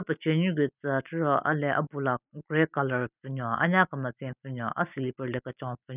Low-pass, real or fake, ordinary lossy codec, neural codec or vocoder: 3.6 kHz; real; none; none